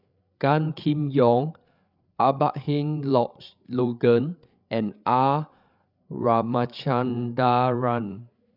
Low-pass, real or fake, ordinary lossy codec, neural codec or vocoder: 5.4 kHz; fake; none; codec, 16 kHz, 8 kbps, FreqCodec, larger model